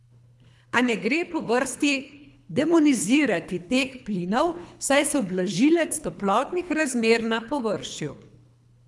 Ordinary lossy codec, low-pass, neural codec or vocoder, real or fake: none; none; codec, 24 kHz, 3 kbps, HILCodec; fake